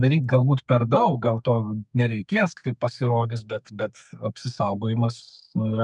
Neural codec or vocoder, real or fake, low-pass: codec, 32 kHz, 1.9 kbps, SNAC; fake; 10.8 kHz